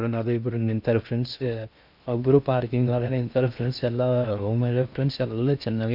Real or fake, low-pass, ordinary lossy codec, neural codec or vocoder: fake; 5.4 kHz; none; codec, 16 kHz in and 24 kHz out, 0.6 kbps, FocalCodec, streaming, 2048 codes